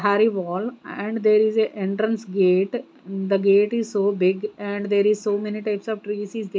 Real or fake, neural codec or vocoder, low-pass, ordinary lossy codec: real; none; none; none